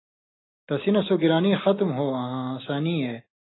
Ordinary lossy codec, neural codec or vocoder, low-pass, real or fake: AAC, 16 kbps; none; 7.2 kHz; real